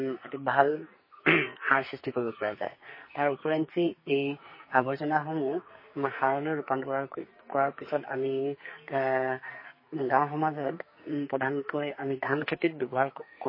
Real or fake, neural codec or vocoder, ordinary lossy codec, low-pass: fake; codec, 44.1 kHz, 2.6 kbps, SNAC; MP3, 24 kbps; 5.4 kHz